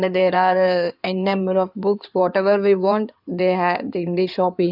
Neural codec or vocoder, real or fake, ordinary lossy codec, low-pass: codec, 16 kHz in and 24 kHz out, 2.2 kbps, FireRedTTS-2 codec; fake; none; 5.4 kHz